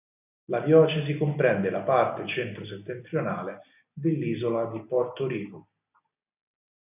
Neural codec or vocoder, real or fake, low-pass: none; real; 3.6 kHz